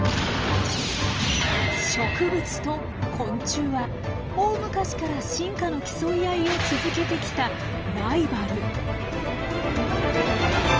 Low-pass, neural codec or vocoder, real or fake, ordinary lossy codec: 7.2 kHz; none; real; Opus, 24 kbps